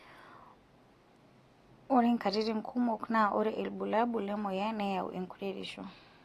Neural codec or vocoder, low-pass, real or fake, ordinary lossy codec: none; 14.4 kHz; real; AAC, 48 kbps